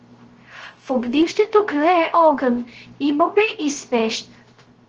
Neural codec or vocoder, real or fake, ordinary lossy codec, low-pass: codec, 16 kHz, 0.3 kbps, FocalCodec; fake; Opus, 16 kbps; 7.2 kHz